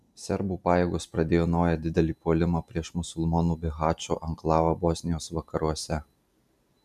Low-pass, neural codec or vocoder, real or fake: 14.4 kHz; none; real